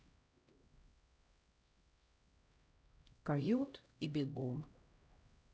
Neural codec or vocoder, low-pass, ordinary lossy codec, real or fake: codec, 16 kHz, 0.5 kbps, X-Codec, HuBERT features, trained on LibriSpeech; none; none; fake